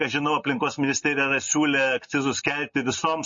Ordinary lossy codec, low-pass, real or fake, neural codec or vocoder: MP3, 32 kbps; 7.2 kHz; real; none